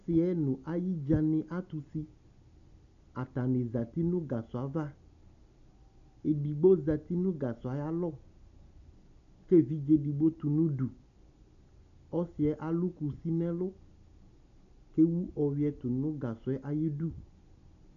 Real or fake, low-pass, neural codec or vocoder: real; 7.2 kHz; none